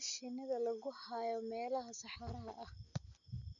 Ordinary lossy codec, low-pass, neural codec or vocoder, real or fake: none; 7.2 kHz; none; real